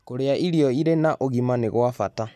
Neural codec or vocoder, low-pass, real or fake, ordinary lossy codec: none; 14.4 kHz; real; none